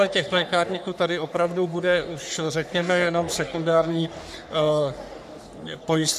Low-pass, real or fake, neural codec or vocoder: 14.4 kHz; fake; codec, 44.1 kHz, 3.4 kbps, Pupu-Codec